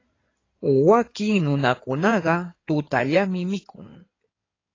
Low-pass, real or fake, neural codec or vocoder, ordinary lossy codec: 7.2 kHz; fake; codec, 16 kHz in and 24 kHz out, 2.2 kbps, FireRedTTS-2 codec; AAC, 32 kbps